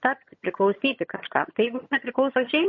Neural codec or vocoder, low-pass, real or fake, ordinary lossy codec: vocoder, 22.05 kHz, 80 mel bands, HiFi-GAN; 7.2 kHz; fake; MP3, 32 kbps